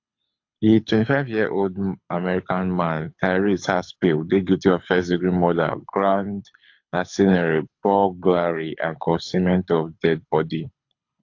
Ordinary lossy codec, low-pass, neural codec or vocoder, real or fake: AAC, 48 kbps; 7.2 kHz; codec, 24 kHz, 6 kbps, HILCodec; fake